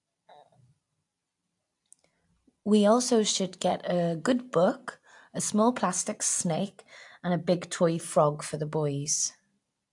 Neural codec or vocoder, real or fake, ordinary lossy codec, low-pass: none; real; AAC, 64 kbps; 10.8 kHz